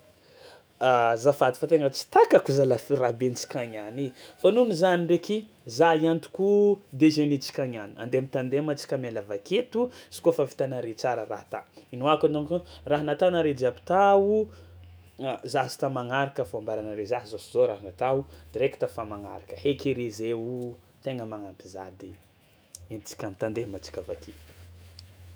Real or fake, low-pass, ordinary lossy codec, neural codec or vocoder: fake; none; none; autoencoder, 48 kHz, 128 numbers a frame, DAC-VAE, trained on Japanese speech